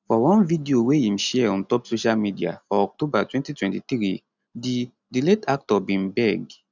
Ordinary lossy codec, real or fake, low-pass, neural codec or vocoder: none; real; 7.2 kHz; none